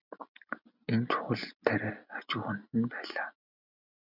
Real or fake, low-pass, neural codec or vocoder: real; 5.4 kHz; none